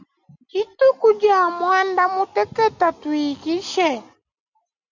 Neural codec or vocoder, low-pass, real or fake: none; 7.2 kHz; real